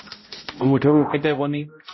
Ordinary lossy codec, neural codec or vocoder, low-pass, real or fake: MP3, 24 kbps; codec, 16 kHz, 0.5 kbps, X-Codec, HuBERT features, trained on balanced general audio; 7.2 kHz; fake